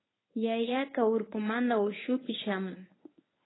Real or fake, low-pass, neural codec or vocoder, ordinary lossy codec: fake; 7.2 kHz; codec, 24 kHz, 0.9 kbps, WavTokenizer, medium speech release version 1; AAC, 16 kbps